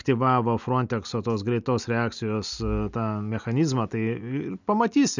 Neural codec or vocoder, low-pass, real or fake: none; 7.2 kHz; real